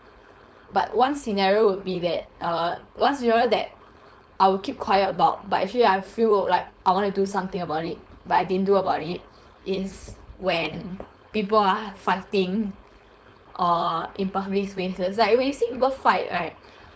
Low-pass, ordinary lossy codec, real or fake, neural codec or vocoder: none; none; fake; codec, 16 kHz, 4.8 kbps, FACodec